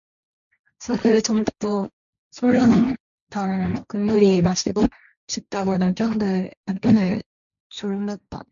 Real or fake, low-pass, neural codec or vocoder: fake; 7.2 kHz; codec, 16 kHz, 1.1 kbps, Voila-Tokenizer